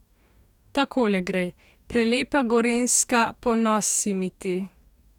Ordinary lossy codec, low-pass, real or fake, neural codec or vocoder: none; 19.8 kHz; fake; codec, 44.1 kHz, 2.6 kbps, DAC